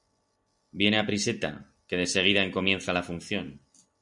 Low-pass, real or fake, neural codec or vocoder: 10.8 kHz; real; none